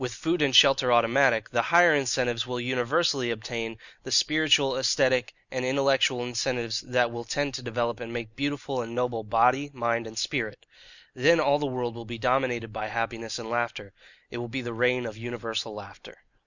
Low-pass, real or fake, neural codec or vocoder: 7.2 kHz; real; none